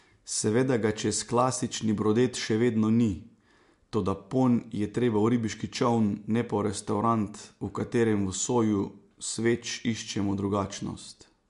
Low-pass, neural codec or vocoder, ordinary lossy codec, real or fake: 10.8 kHz; none; MP3, 64 kbps; real